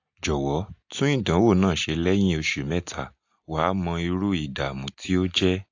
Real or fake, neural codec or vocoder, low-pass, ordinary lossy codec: real; none; 7.2 kHz; AAC, 48 kbps